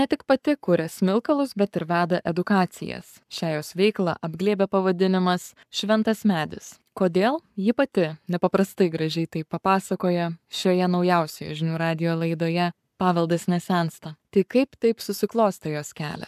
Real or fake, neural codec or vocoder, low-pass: fake; codec, 44.1 kHz, 7.8 kbps, Pupu-Codec; 14.4 kHz